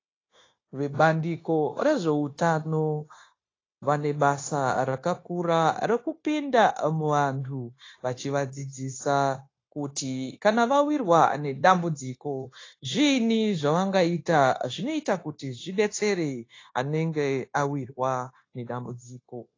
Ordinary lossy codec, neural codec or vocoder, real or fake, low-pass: AAC, 32 kbps; codec, 16 kHz, 0.9 kbps, LongCat-Audio-Codec; fake; 7.2 kHz